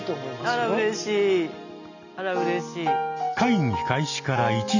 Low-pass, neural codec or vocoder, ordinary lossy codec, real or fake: 7.2 kHz; none; none; real